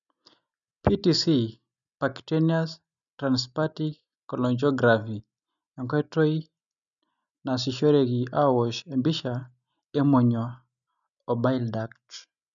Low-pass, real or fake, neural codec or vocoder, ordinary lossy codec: 7.2 kHz; real; none; none